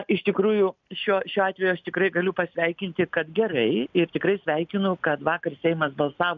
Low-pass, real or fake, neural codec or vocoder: 7.2 kHz; real; none